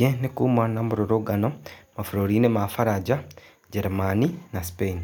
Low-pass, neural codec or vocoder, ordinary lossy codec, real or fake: none; none; none; real